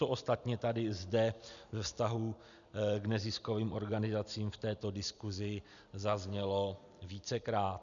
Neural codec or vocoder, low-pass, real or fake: none; 7.2 kHz; real